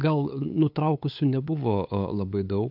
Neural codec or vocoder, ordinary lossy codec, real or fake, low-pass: codec, 16 kHz, 8 kbps, FunCodec, trained on LibriTTS, 25 frames a second; AAC, 48 kbps; fake; 5.4 kHz